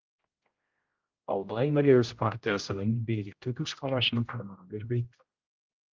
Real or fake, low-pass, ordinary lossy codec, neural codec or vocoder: fake; 7.2 kHz; Opus, 24 kbps; codec, 16 kHz, 0.5 kbps, X-Codec, HuBERT features, trained on general audio